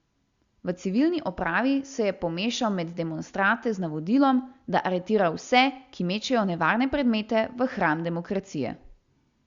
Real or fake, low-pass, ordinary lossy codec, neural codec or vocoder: real; 7.2 kHz; Opus, 64 kbps; none